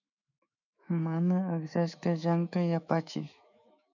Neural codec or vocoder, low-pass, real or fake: autoencoder, 48 kHz, 128 numbers a frame, DAC-VAE, trained on Japanese speech; 7.2 kHz; fake